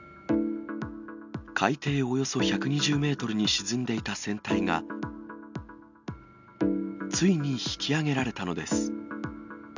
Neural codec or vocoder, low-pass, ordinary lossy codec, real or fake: none; 7.2 kHz; none; real